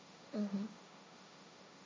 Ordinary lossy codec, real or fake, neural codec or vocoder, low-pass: none; fake; codec, 16 kHz, 1.1 kbps, Voila-Tokenizer; none